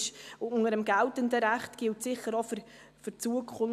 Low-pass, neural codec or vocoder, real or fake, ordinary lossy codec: 14.4 kHz; none; real; AAC, 96 kbps